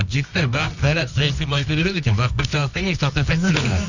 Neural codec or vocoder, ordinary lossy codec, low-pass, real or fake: codec, 24 kHz, 0.9 kbps, WavTokenizer, medium music audio release; none; 7.2 kHz; fake